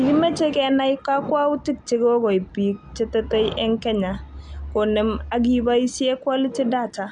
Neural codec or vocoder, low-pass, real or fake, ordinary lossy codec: none; 9.9 kHz; real; none